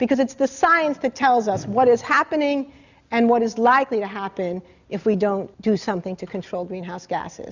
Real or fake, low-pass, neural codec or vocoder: real; 7.2 kHz; none